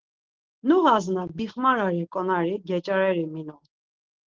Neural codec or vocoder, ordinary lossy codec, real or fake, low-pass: none; Opus, 16 kbps; real; 7.2 kHz